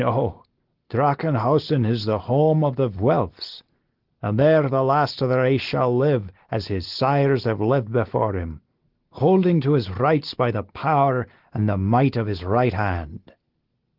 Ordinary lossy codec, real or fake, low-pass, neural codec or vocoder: Opus, 16 kbps; real; 5.4 kHz; none